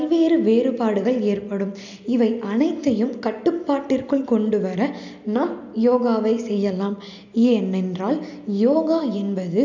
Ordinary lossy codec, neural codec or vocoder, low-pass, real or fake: none; none; 7.2 kHz; real